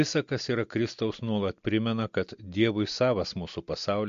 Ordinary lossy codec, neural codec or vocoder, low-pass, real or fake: MP3, 48 kbps; none; 7.2 kHz; real